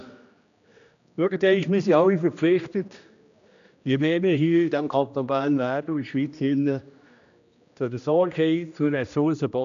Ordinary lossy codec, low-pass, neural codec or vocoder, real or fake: MP3, 96 kbps; 7.2 kHz; codec, 16 kHz, 1 kbps, X-Codec, HuBERT features, trained on general audio; fake